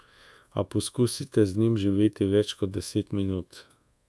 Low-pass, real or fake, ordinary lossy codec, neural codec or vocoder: none; fake; none; codec, 24 kHz, 1.2 kbps, DualCodec